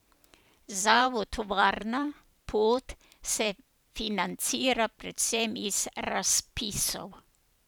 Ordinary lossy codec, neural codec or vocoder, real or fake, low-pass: none; vocoder, 44.1 kHz, 128 mel bands every 512 samples, BigVGAN v2; fake; none